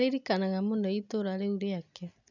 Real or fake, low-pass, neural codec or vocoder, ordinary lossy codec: real; 7.2 kHz; none; none